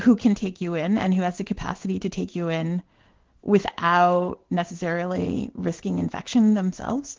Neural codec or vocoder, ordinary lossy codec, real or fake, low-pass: codec, 16 kHz in and 24 kHz out, 1 kbps, XY-Tokenizer; Opus, 16 kbps; fake; 7.2 kHz